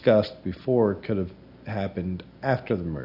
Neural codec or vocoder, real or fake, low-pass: none; real; 5.4 kHz